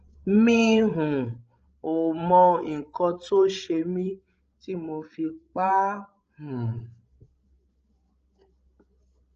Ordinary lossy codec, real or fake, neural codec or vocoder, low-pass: Opus, 24 kbps; fake; codec, 16 kHz, 16 kbps, FreqCodec, larger model; 7.2 kHz